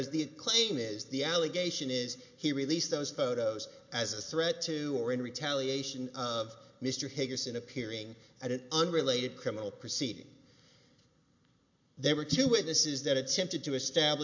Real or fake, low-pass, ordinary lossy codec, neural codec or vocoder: real; 7.2 kHz; MP3, 48 kbps; none